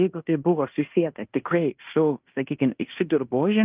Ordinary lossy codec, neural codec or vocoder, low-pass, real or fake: Opus, 24 kbps; codec, 16 kHz in and 24 kHz out, 0.9 kbps, LongCat-Audio-Codec, fine tuned four codebook decoder; 3.6 kHz; fake